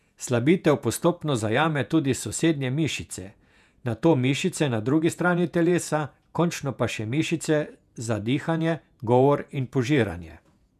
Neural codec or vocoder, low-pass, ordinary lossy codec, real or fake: vocoder, 48 kHz, 128 mel bands, Vocos; 14.4 kHz; none; fake